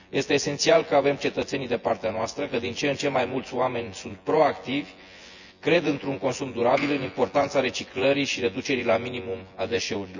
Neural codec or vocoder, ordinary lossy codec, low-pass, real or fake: vocoder, 24 kHz, 100 mel bands, Vocos; none; 7.2 kHz; fake